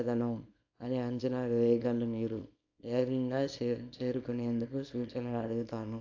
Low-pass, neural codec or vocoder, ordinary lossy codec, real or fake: 7.2 kHz; codec, 24 kHz, 0.9 kbps, WavTokenizer, small release; AAC, 48 kbps; fake